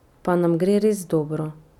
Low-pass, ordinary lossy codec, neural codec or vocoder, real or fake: 19.8 kHz; none; none; real